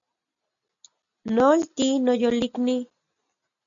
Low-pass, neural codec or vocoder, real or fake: 7.2 kHz; none; real